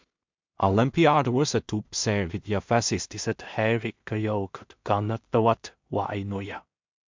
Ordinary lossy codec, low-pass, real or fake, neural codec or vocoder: MP3, 64 kbps; 7.2 kHz; fake; codec, 16 kHz in and 24 kHz out, 0.4 kbps, LongCat-Audio-Codec, two codebook decoder